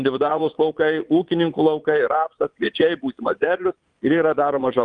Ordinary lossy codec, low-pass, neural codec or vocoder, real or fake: Opus, 32 kbps; 9.9 kHz; vocoder, 22.05 kHz, 80 mel bands, Vocos; fake